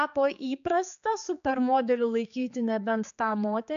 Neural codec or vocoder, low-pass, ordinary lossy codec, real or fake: codec, 16 kHz, 4 kbps, X-Codec, HuBERT features, trained on general audio; 7.2 kHz; AAC, 96 kbps; fake